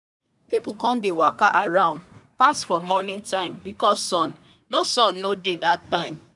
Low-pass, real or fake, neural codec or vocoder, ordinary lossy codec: 10.8 kHz; fake; codec, 24 kHz, 1 kbps, SNAC; none